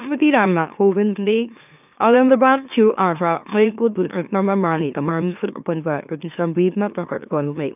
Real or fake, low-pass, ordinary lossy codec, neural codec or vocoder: fake; 3.6 kHz; none; autoencoder, 44.1 kHz, a latent of 192 numbers a frame, MeloTTS